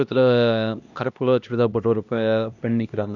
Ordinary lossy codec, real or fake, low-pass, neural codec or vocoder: none; fake; 7.2 kHz; codec, 16 kHz, 1 kbps, X-Codec, HuBERT features, trained on LibriSpeech